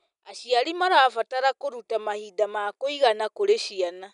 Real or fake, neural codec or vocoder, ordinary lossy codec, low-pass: real; none; none; 10.8 kHz